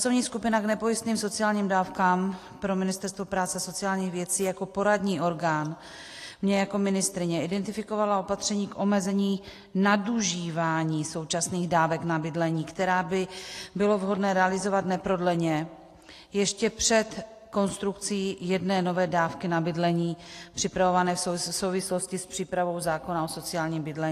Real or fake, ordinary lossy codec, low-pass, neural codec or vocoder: real; AAC, 48 kbps; 14.4 kHz; none